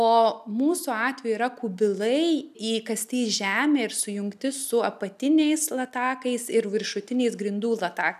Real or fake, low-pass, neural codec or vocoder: real; 14.4 kHz; none